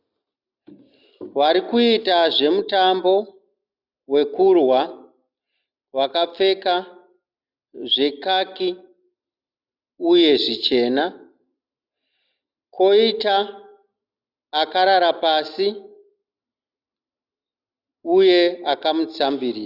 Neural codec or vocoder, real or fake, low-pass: none; real; 5.4 kHz